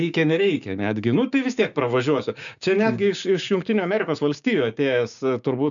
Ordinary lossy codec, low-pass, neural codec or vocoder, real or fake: MP3, 96 kbps; 7.2 kHz; codec, 16 kHz, 6 kbps, DAC; fake